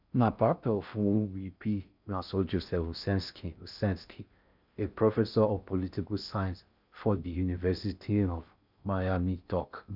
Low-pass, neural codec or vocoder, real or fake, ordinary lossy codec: 5.4 kHz; codec, 16 kHz in and 24 kHz out, 0.6 kbps, FocalCodec, streaming, 4096 codes; fake; none